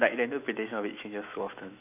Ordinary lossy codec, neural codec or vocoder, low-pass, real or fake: none; autoencoder, 48 kHz, 128 numbers a frame, DAC-VAE, trained on Japanese speech; 3.6 kHz; fake